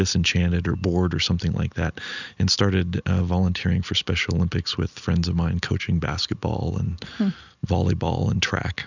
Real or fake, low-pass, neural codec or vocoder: real; 7.2 kHz; none